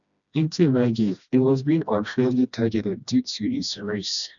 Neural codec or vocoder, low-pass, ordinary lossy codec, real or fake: codec, 16 kHz, 1 kbps, FreqCodec, smaller model; 7.2 kHz; none; fake